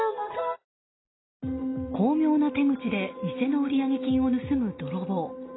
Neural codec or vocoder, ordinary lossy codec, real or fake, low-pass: none; AAC, 16 kbps; real; 7.2 kHz